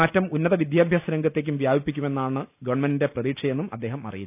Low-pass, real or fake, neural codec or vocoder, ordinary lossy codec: 3.6 kHz; real; none; none